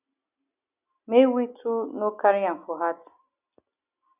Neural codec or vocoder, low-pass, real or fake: none; 3.6 kHz; real